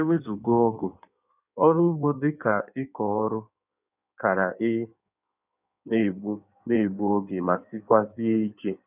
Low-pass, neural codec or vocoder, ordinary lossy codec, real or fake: 3.6 kHz; codec, 16 kHz in and 24 kHz out, 1.1 kbps, FireRedTTS-2 codec; none; fake